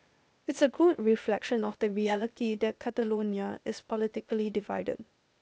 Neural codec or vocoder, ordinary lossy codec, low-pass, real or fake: codec, 16 kHz, 0.8 kbps, ZipCodec; none; none; fake